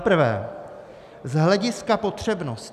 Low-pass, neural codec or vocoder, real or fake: 14.4 kHz; none; real